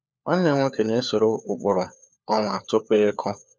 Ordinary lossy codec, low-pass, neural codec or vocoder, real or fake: none; none; codec, 16 kHz, 4 kbps, FunCodec, trained on LibriTTS, 50 frames a second; fake